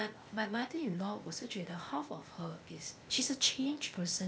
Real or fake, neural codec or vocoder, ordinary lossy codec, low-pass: fake; codec, 16 kHz, 0.8 kbps, ZipCodec; none; none